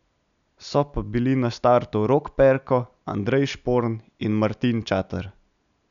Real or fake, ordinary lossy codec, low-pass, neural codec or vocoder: real; none; 7.2 kHz; none